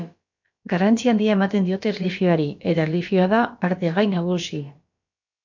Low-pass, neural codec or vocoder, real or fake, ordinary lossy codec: 7.2 kHz; codec, 16 kHz, about 1 kbps, DyCAST, with the encoder's durations; fake; MP3, 48 kbps